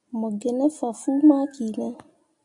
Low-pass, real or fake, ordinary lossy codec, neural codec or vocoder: 10.8 kHz; real; AAC, 64 kbps; none